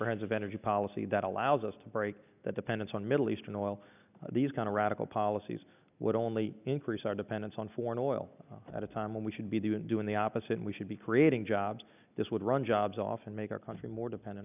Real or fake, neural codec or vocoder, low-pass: real; none; 3.6 kHz